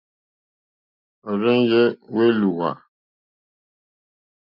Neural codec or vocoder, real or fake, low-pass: none; real; 5.4 kHz